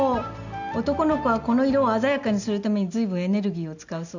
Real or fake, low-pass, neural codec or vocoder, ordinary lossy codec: real; 7.2 kHz; none; none